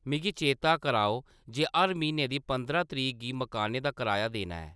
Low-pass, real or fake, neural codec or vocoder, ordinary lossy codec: none; real; none; none